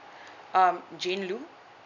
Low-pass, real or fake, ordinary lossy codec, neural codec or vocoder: 7.2 kHz; real; none; none